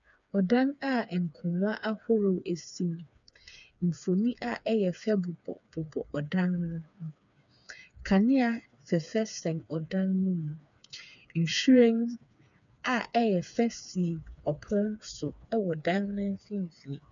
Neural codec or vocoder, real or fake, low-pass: codec, 16 kHz, 4 kbps, FreqCodec, smaller model; fake; 7.2 kHz